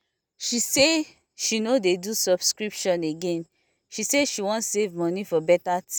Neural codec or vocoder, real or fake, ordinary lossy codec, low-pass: none; real; none; none